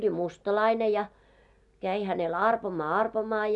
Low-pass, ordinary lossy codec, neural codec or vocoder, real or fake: 10.8 kHz; none; none; real